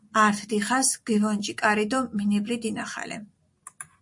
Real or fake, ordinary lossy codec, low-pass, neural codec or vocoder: real; MP3, 48 kbps; 10.8 kHz; none